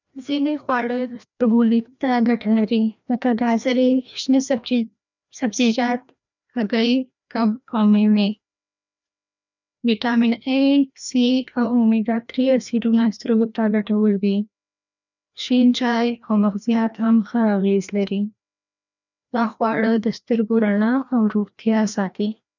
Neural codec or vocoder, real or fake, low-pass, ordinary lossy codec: codec, 16 kHz, 1 kbps, FreqCodec, larger model; fake; 7.2 kHz; none